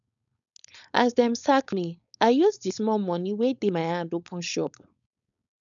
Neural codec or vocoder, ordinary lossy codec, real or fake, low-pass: codec, 16 kHz, 4.8 kbps, FACodec; none; fake; 7.2 kHz